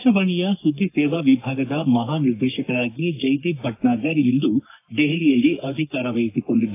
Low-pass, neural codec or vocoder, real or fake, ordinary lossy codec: 3.6 kHz; codec, 44.1 kHz, 3.4 kbps, Pupu-Codec; fake; AAC, 24 kbps